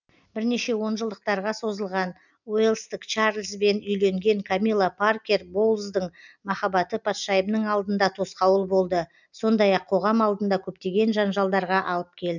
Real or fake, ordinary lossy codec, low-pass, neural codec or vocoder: real; none; 7.2 kHz; none